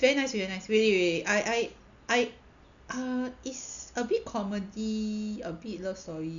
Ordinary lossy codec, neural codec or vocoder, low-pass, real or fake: none; none; 7.2 kHz; real